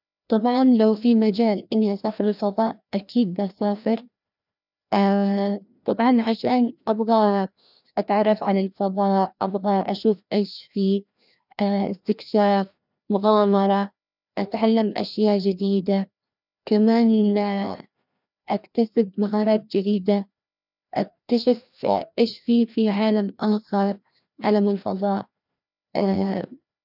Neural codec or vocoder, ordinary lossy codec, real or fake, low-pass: codec, 16 kHz, 1 kbps, FreqCodec, larger model; none; fake; 5.4 kHz